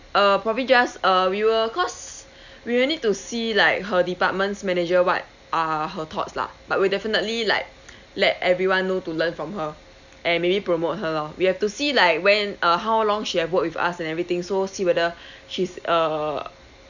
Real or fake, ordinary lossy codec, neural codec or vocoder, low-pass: real; none; none; 7.2 kHz